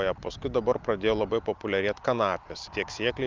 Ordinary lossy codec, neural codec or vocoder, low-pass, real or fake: Opus, 24 kbps; none; 7.2 kHz; real